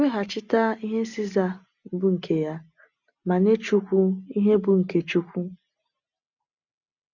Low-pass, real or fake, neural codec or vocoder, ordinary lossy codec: 7.2 kHz; real; none; none